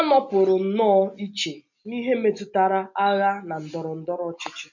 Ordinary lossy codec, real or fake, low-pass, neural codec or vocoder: none; real; 7.2 kHz; none